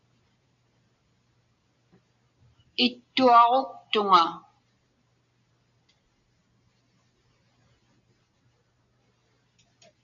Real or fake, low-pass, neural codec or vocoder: real; 7.2 kHz; none